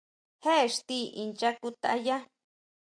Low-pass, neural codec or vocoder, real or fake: 9.9 kHz; none; real